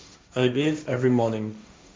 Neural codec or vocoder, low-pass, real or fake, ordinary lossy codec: codec, 16 kHz, 1.1 kbps, Voila-Tokenizer; none; fake; none